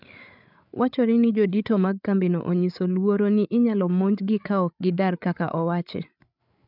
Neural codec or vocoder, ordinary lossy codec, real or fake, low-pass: codec, 16 kHz, 8 kbps, FreqCodec, larger model; none; fake; 5.4 kHz